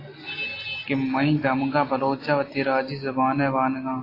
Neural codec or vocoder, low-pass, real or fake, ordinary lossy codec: none; 5.4 kHz; real; AAC, 24 kbps